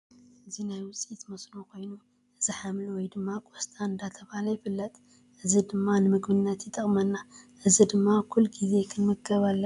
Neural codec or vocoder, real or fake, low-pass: none; real; 10.8 kHz